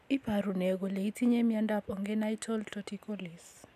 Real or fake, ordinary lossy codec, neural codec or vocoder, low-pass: real; none; none; 14.4 kHz